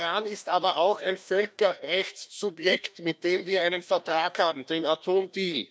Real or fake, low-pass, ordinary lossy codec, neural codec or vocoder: fake; none; none; codec, 16 kHz, 1 kbps, FreqCodec, larger model